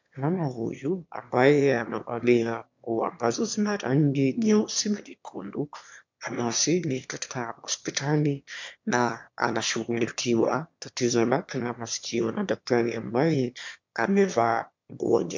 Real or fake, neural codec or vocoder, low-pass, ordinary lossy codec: fake; autoencoder, 22.05 kHz, a latent of 192 numbers a frame, VITS, trained on one speaker; 7.2 kHz; MP3, 64 kbps